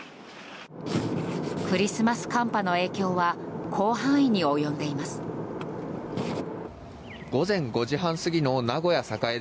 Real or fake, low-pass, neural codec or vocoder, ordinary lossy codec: real; none; none; none